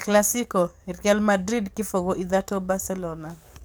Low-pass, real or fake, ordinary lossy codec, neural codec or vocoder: none; fake; none; codec, 44.1 kHz, 7.8 kbps, DAC